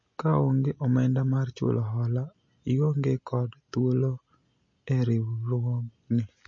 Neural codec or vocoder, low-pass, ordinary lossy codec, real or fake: none; 7.2 kHz; MP3, 32 kbps; real